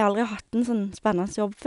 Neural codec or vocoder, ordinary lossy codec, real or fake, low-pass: none; none; real; 10.8 kHz